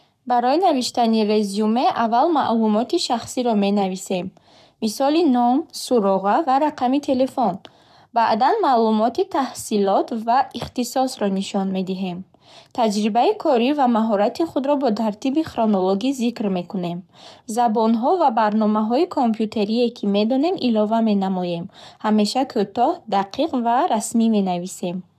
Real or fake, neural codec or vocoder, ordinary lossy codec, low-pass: fake; codec, 44.1 kHz, 7.8 kbps, Pupu-Codec; none; 14.4 kHz